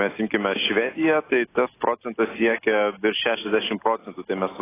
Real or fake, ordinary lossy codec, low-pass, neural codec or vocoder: real; AAC, 16 kbps; 3.6 kHz; none